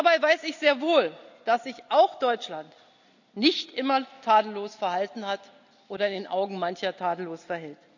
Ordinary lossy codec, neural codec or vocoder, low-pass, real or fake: none; none; 7.2 kHz; real